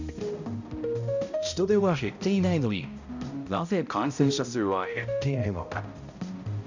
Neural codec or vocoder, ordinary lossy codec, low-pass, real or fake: codec, 16 kHz, 0.5 kbps, X-Codec, HuBERT features, trained on balanced general audio; none; 7.2 kHz; fake